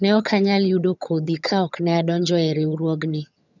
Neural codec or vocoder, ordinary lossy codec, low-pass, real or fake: vocoder, 22.05 kHz, 80 mel bands, HiFi-GAN; none; 7.2 kHz; fake